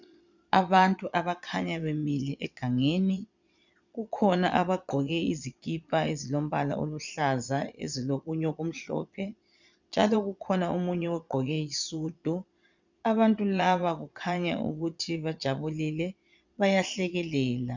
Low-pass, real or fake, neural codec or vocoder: 7.2 kHz; fake; vocoder, 22.05 kHz, 80 mel bands, Vocos